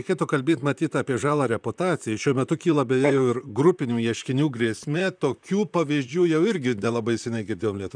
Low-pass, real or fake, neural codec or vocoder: 9.9 kHz; fake; vocoder, 44.1 kHz, 128 mel bands, Pupu-Vocoder